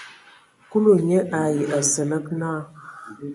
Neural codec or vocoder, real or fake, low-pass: vocoder, 24 kHz, 100 mel bands, Vocos; fake; 10.8 kHz